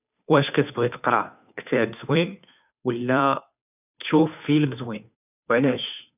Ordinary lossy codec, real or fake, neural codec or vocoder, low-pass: none; fake; codec, 16 kHz, 2 kbps, FunCodec, trained on Chinese and English, 25 frames a second; 3.6 kHz